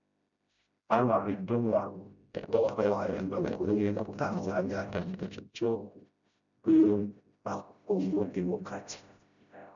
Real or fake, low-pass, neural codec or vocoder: fake; 7.2 kHz; codec, 16 kHz, 0.5 kbps, FreqCodec, smaller model